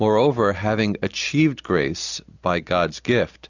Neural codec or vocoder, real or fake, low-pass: none; real; 7.2 kHz